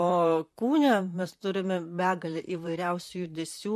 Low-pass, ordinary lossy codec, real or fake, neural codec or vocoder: 14.4 kHz; MP3, 64 kbps; fake; vocoder, 44.1 kHz, 128 mel bands, Pupu-Vocoder